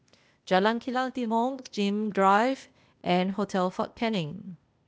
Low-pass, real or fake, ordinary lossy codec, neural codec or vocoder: none; fake; none; codec, 16 kHz, 0.8 kbps, ZipCodec